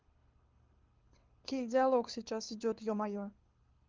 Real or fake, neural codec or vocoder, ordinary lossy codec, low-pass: fake; codec, 24 kHz, 6 kbps, HILCodec; Opus, 32 kbps; 7.2 kHz